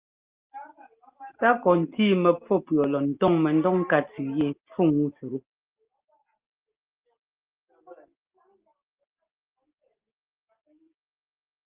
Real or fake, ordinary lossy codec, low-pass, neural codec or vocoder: real; Opus, 32 kbps; 3.6 kHz; none